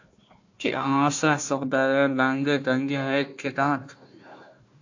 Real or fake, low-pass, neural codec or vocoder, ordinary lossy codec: fake; 7.2 kHz; codec, 16 kHz, 1 kbps, FunCodec, trained on Chinese and English, 50 frames a second; AAC, 48 kbps